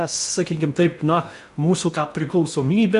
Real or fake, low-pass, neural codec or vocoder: fake; 10.8 kHz; codec, 16 kHz in and 24 kHz out, 0.6 kbps, FocalCodec, streaming, 2048 codes